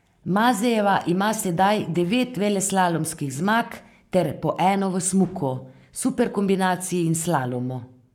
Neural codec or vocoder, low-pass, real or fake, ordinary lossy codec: codec, 44.1 kHz, 7.8 kbps, Pupu-Codec; 19.8 kHz; fake; none